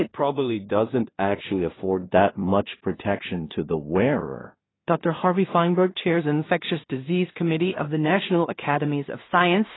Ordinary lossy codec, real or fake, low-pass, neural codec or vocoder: AAC, 16 kbps; fake; 7.2 kHz; codec, 16 kHz in and 24 kHz out, 0.4 kbps, LongCat-Audio-Codec, two codebook decoder